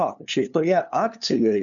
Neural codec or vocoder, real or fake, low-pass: codec, 16 kHz, 2 kbps, FunCodec, trained on LibriTTS, 25 frames a second; fake; 7.2 kHz